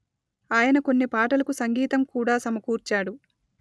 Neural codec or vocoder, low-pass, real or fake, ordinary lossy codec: none; none; real; none